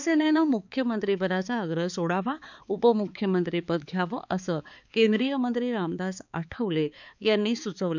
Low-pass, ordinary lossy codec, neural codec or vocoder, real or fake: 7.2 kHz; none; codec, 16 kHz, 4 kbps, X-Codec, HuBERT features, trained on balanced general audio; fake